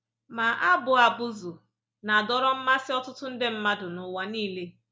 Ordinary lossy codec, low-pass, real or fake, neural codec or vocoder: none; none; real; none